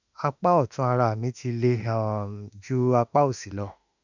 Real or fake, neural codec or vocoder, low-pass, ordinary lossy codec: fake; autoencoder, 48 kHz, 32 numbers a frame, DAC-VAE, trained on Japanese speech; 7.2 kHz; none